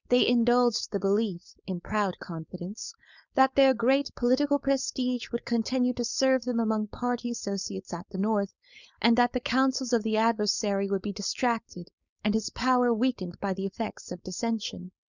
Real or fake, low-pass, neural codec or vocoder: fake; 7.2 kHz; codec, 16 kHz, 4.8 kbps, FACodec